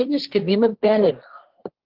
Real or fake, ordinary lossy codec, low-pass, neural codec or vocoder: fake; Opus, 16 kbps; 5.4 kHz; codec, 16 kHz, 1.1 kbps, Voila-Tokenizer